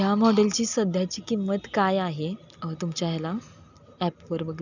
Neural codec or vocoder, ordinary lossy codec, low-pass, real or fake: none; none; 7.2 kHz; real